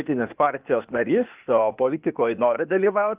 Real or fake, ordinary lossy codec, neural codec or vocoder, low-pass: fake; Opus, 24 kbps; codec, 16 kHz, 0.8 kbps, ZipCodec; 3.6 kHz